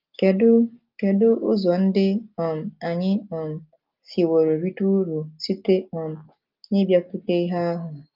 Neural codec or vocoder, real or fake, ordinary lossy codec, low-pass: none; real; Opus, 24 kbps; 5.4 kHz